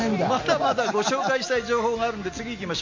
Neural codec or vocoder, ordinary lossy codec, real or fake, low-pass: none; MP3, 48 kbps; real; 7.2 kHz